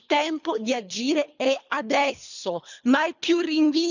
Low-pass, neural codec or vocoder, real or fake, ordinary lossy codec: 7.2 kHz; codec, 24 kHz, 3 kbps, HILCodec; fake; none